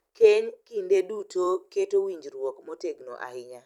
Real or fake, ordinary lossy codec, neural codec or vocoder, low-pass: fake; none; vocoder, 44.1 kHz, 128 mel bands every 256 samples, BigVGAN v2; 19.8 kHz